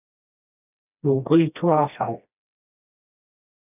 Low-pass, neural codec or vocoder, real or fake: 3.6 kHz; codec, 16 kHz, 1 kbps, FreqCodec, smaller model; fake